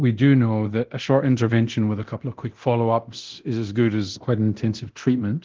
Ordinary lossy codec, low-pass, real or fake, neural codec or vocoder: Opus, 16 kbps; 7.2 kHz; fake; codec, 24 kHz, 0.9 kbps, DualCodec